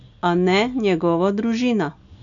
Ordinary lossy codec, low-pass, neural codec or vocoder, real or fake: none; 7.2 kHz; none; real